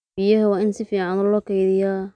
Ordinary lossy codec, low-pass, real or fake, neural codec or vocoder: none; 9.9 kHz; real; none